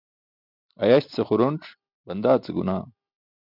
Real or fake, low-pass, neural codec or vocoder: real; 5.4 kHz; none